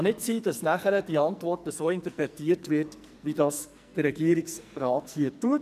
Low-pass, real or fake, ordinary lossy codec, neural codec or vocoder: 14.4 kHz; fake; none; codec, 44.1 kHz, 2.6 kbps, SNAC